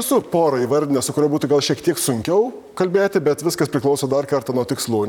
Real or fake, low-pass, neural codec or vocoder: fake; 19.8 kHz; vocoder, 44.1 kHz, 128 mel bands, Pupu-Vocoder